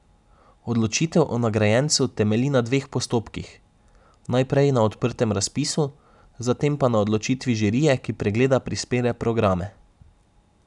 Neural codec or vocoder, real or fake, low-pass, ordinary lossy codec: none; real; 10.8 kHz; none